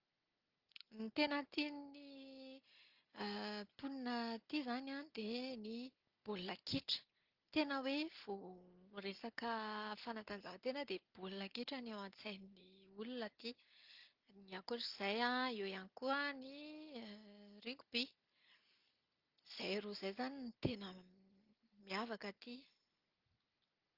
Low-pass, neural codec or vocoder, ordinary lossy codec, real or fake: 5.4 kHz; none; Opus, 32 kbps; real